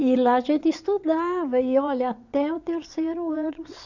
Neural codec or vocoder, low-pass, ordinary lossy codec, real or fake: vocoder, 22.05 kHz, 80 mel bands, WaveNeXt; 7.2 kHz; none; fake